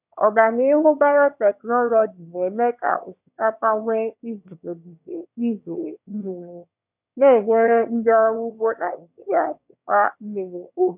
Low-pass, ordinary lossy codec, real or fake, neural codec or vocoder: 3.6 kHz; none; fake; autoencoder, 22.05 kHz, a latent of 192 numbers a frame, VITS, trained on one speaker